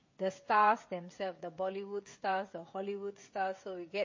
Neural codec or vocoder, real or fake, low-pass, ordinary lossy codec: codec, 16 kHz, 16 kbps, FreqCodec, smaller model; fake; 7.2 kHz; MP3, 32 kbps